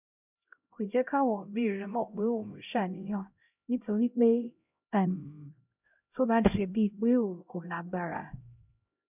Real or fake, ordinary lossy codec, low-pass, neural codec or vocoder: fake; none; 3.6 kHz; codec, 16 kHz, 0.5 kbps, X-Codec, HuBERT features, trained on LibriSpeech